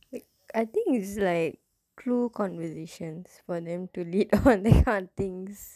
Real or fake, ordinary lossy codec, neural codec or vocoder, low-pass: real; none; none; 14.4 kHz